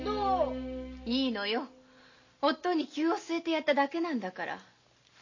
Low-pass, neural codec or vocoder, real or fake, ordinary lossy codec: 7.2 kHz; none; real; MP3, 32 kbps